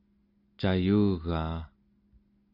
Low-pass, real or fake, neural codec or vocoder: 5.4 kHz; real; none